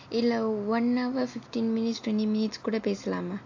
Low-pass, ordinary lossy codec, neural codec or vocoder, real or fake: 7.2 kHz; AAC, 48 kbps; none; real